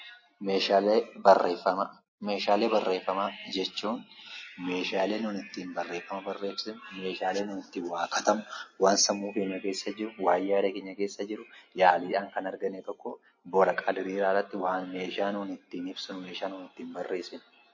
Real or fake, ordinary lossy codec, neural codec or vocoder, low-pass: real; MP3, 32 kbps; none; 7.2 kHz